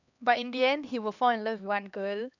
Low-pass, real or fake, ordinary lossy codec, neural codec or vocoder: 7.2 kHz; fake; none; codec, 16 kHz, 2 kbps, X-Codec, HuBERT features, trained on LibriSpeech